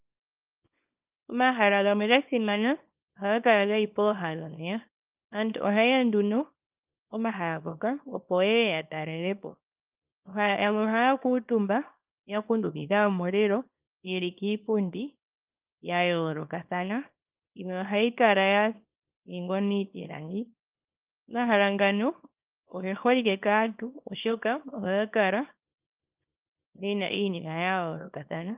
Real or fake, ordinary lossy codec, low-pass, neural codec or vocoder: fake; Opus, 24 kbps; 3.6 kHz; codec, 24 kHz, 0.9 kbps, WavTokenizer, small release